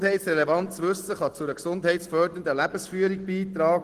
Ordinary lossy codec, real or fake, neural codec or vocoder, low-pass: Opus, 16 kbps; real; none; 14.4 kHz